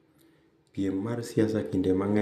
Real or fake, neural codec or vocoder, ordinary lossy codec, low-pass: real; none; none; 14.4 kHz